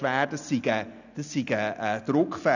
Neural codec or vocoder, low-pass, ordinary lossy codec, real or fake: none; 7.2 kHz; none; real